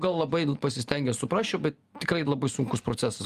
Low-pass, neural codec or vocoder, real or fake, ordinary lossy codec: 14.4 kHz; none; real; Opus, 32 kbps